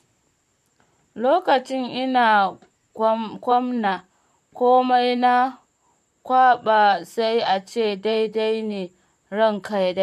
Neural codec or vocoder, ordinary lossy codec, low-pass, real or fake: vocoder, 44.1 kHz, 128 mel bands, Pupu-Vocoder; MP3, 64 kbps; 14.4 kHz; fake